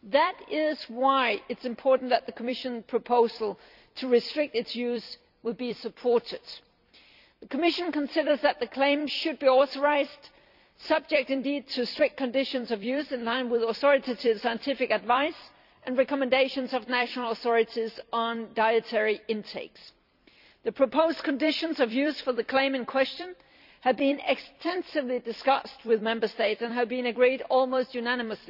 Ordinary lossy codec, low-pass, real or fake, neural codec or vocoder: none; 5.4 kHz; fake; vocoder, 44.1 kHz, 128 mel bands every 256 samples, BigVGAN v2